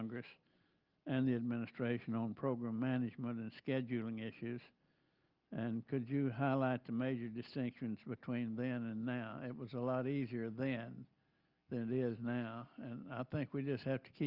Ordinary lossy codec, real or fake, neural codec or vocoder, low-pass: Opus, 32 kbps; real; none; 5.4 kHz